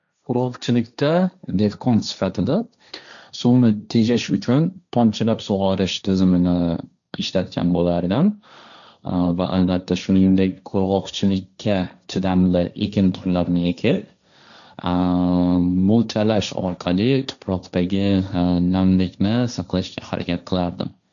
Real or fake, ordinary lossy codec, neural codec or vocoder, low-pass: fake; none; codec, 16 kHz, 1.1 kbps, Voila-Tokenizer; 7.2 kHz